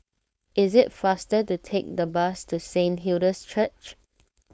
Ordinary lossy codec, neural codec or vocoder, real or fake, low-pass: none; codec, 16 kHz, 4.8 kbps, FACodec; fake; none